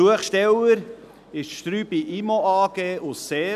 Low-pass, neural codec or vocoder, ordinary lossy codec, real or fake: 14.4 kHz; none; none; real